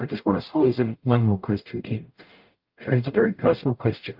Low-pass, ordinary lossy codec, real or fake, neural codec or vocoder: 5.4 kHz; Opus, 24 kbps; fake; codec, 44.1 kHz, 0.9 kbps, DAC